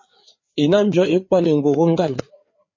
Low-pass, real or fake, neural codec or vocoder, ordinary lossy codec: 7.2 kHz; fake; codec, 16 kHz, 4 kbps, FreqCodec, larger model; MP3, 32 kbps